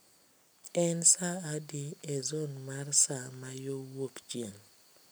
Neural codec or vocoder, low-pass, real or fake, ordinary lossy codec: none; none; real; none